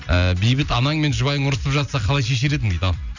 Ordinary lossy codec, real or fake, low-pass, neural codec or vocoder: none; real; 7.2 kHz; none